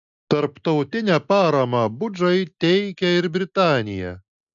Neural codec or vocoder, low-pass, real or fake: none; 7.2 kHz; real